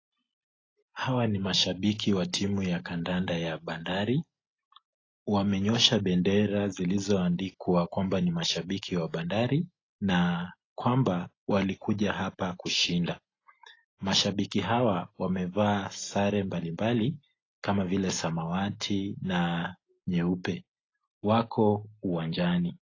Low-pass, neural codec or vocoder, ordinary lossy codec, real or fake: 7.2 kHz; none; AAC, 32 kbps; real